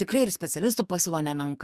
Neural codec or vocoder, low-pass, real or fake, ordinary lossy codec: codec, 44.1 kHz, 2.6 kbps, SNAC; 14.4 kHz; fake; Opus, 64 kbps